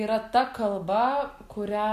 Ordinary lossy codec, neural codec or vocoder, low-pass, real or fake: MP3, 64 kbps; none; 14.4 kHz; real